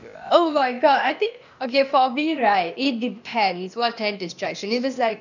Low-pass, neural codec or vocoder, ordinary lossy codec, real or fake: 7.2 kHz; codec, 16 kHz, 0.8 kbps, ZipCodec; none; fake